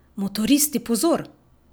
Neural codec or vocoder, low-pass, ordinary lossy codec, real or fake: none; none; none; real